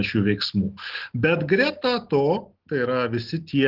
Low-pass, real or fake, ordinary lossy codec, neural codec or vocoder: 5.4 kHz; real; Opus, 16 kbps; none